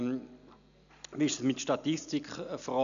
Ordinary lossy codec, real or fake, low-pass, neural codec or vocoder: none; real; 7.2 kHz; none